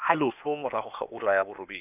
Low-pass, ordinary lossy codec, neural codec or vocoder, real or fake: 3.6 kHz; none; codec, 16 kHz, 0.8 kbps, ZipCodec; fake